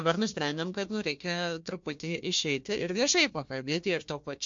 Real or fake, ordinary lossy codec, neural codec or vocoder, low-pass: fake; MP3, 48 kbps; codec, 16 kHz, 1 kbps, FunCodec, trained on Chinese and English, 50 frames a second; 7.2 kHz